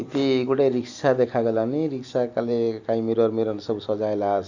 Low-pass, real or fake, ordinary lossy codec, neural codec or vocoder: 7.2 kHz; real; Opus, 64 kbps; none